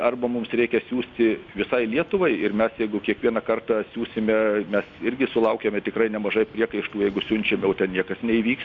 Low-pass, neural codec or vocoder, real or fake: 7.2 kHz; none; real